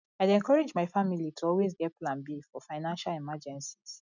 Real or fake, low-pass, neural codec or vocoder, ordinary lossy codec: real; 7.2 kHz; none; none